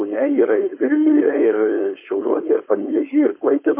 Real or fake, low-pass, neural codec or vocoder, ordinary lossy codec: fake; 3.6 kHz; codec, 16 kHz, 4.8 kbps, FACodec; MP3, 24 kbps